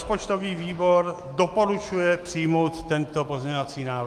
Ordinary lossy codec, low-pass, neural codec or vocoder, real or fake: Opus, 32 kbps; 14.4 kHz; autoencoder, 48 kHz, 128 numbers a frame, DAC-VAE, trained on Japanese speech; fake